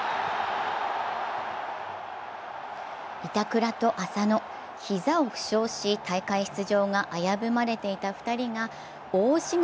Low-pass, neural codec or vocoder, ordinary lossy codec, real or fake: none; none; none; real